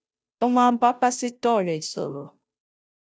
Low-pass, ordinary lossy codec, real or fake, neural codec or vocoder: none; none; fake; codec, 16 kHz, 0.5 kbps, FunCodec, trained on Chinese and English, 25 frames a second